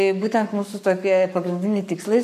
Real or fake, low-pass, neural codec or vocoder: fake; 14.4 kHz; codec, 44.1 kHz, 7.8 kbps, Pupu-Codec